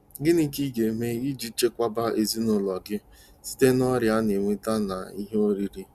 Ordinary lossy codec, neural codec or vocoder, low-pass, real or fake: Opus, 64 kbps; none; 14.4 kHz; real